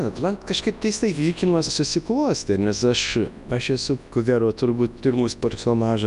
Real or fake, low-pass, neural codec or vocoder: fake; 10.8 kHz; codec, 24 kHz, 0.9 kbps, WavTokenizer, large speech release